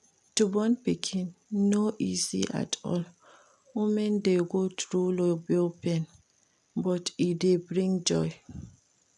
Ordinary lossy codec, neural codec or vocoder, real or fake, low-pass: none; none; real; none